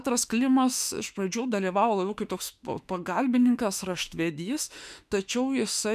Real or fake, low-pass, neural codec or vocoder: fake; 14.4 kHz; autoencoder, 48 kHz, 32 numbers a frame, DAC-VAE, trained on Japanese speech